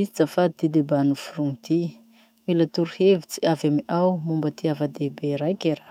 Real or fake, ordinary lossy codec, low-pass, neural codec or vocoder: fake; none; 19.8 kHz; autoencoder, 48 kHz, 128 numbers a frame, DAC-VAE, trained on Japanese speech